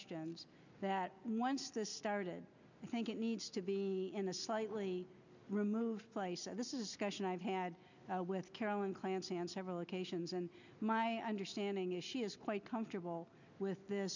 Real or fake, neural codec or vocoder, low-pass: real; none; 7.2 kHz